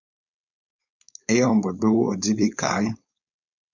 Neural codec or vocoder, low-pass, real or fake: codec, 16 kHz, 4.8 kbps, FACodec; 7.2 kHz; fake